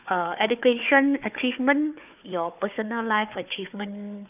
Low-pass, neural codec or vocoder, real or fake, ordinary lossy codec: 3.6 kHz; codec, 16 kHz, 4 kbps, FunCodec, trained on Chinese and English, 50 frames a second; fake; none